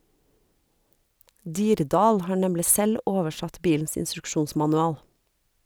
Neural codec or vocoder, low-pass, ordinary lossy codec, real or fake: none; none; none; real